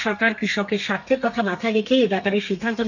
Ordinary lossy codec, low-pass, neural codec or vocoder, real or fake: none; 7.2 kHz; codec, 32 kHz, 1.9 kbps, SNAC; fake